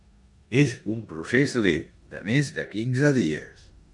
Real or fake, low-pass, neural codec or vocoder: fake; 10.8 kHz; codec, 16 kHz in and 24 kHz out, 0.9 kbps, LongCat-Audio-Codec, four codebook decoder